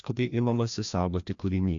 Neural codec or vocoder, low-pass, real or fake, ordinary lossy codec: codec, 16 kHz, 1 kbps, FreqCodec, larger model; 7.2 kHz; fake; AAC, 64 kbps